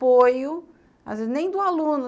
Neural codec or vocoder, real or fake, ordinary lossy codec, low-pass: none; real; none; none